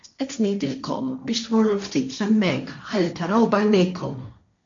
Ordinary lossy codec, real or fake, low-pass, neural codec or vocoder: MP3, 64 kbps; fake; 7.2 kHz; codec, 16 kHz, 1.1 kbps, Voila-Tokenizer